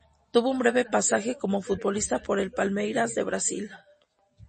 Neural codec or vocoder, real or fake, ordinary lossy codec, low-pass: none; real; MP3, 32 kbps; 9.9 kHz